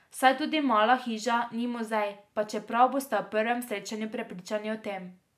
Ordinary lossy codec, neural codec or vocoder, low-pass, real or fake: AAC, 96 kbps; none; 14.4 kHz; real